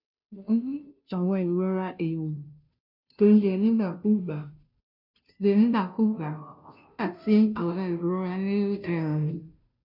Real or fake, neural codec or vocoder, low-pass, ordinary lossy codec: fake; codec, 16 kHz, 0.5 kbps, FunCodec, trained on Chinese and English, 25 frames a second; 5.4 kHz; none